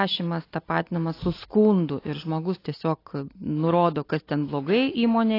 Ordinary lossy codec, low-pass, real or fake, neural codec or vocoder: AAC, 24 kbps; 5.4 kHz; real; none